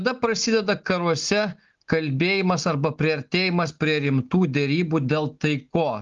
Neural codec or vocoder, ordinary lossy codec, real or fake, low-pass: none; Opus, 32 kbps; real; 7.2 kHz